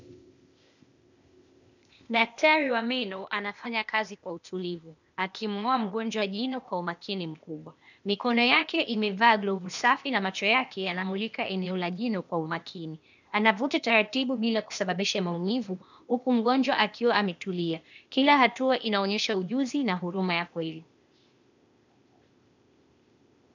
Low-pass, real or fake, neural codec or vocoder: 7.2 kHz; fake; codec, 16 kHz, 0.8 kbps, ZipCodec